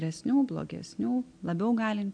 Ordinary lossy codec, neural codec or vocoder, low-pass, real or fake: MP3, 64 kbps; none; 9.9 kHz; real